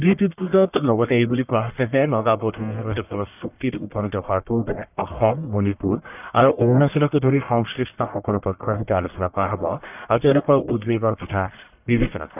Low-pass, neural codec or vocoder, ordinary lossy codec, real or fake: 3.6 kHz; codec, 44.1 kHz, 1.7 kbps, Pupu-Codec; none; fake